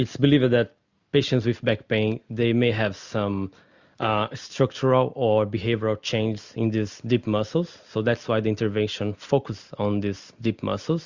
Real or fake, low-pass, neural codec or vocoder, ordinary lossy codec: real; 7.2 kHz; none; Opus, 64 kbps